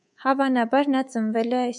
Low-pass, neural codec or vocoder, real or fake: 10.8 kHz; codec, 24 kHz, 3.1 kbps, DualCodec; fake